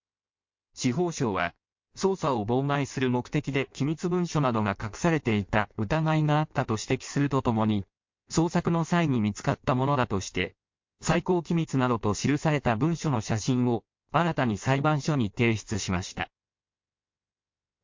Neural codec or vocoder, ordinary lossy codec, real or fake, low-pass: codec, 16 kHz in and 24 kHz out, 1.1 kbps, FireRedTTS-2 codec; MP3, 48 kbps; fake; 7.2 kHz